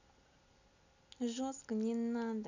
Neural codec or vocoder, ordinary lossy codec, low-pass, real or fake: none; none; 7.2 kHz; real